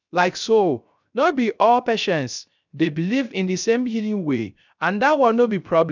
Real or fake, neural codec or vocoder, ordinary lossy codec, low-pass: fake; codec, 16 kHz, 0.3 kbps, FocalCodec; none; 7.2 kHz